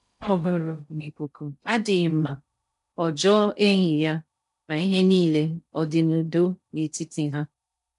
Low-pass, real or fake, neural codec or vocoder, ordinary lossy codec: 10.8 kHz; fake; codec, 16 kHz in and 24 kHz out, 0.6 kbps, FocalCodec, streaming, 2048 codes; none